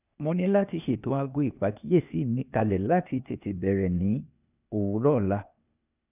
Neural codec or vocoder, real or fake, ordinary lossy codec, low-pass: codec, 16 kHz, 0.8 kbps, ZipCodec; fake; none; 3.6 kHz